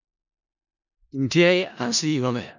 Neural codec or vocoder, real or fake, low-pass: codec, 16 kHz in and 24 kHz out, 0.4 kbps, LongCat-Audio-Codec, four codebook decoder; fake; 7.2 kHz